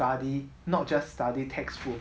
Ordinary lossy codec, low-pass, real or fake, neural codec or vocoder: none; none; real; none